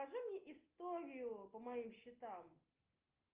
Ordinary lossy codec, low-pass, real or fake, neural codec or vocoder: Opus, 24 kbps; 3.6 kHz; real; none